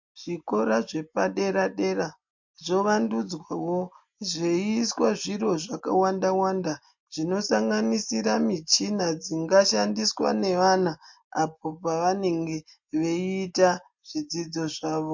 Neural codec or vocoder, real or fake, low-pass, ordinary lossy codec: none; real; 7.2 kHz; MP3, 48 kbps